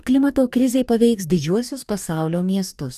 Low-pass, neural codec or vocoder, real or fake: 14.4 kHz; codec, 44.1 kHz, 2.6 kbps, DAC; fake